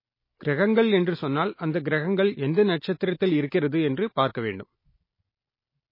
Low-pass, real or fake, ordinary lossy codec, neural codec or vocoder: 5.4 kHz; real; MP3, 24 kbps; none